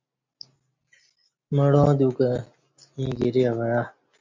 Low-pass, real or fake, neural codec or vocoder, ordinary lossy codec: 7.2 kHz; real; none; MP3, 64 kbps